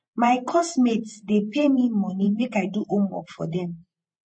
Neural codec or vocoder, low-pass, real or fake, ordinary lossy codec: vocoder, 48 kHz, 128 mel bands, Vocos; 9.9 kHz; fake; MP3, 32 kbps